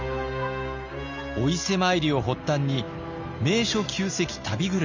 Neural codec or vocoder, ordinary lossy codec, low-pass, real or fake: none; none; 7.2 kHz; real